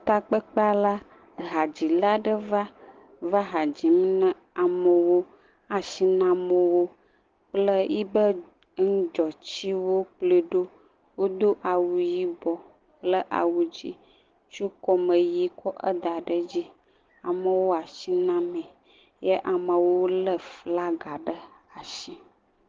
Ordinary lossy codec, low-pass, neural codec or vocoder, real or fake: Opus, 16 kbps; 7.2 kHz; none; real